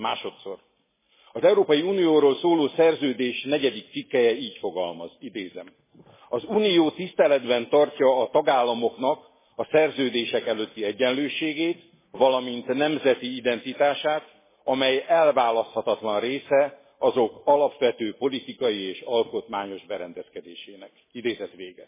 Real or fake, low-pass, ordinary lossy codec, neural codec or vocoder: real; 3.6 kHz; MP3, 16 kbps; none